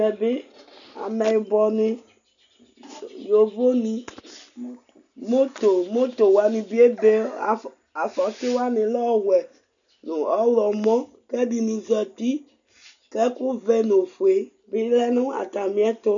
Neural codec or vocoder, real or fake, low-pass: none; real; 7.2 kHz